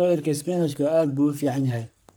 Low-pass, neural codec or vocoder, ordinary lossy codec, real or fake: none; codec, 44.1 kHz, 3.4 kbps, Pupu-Codec; none; fake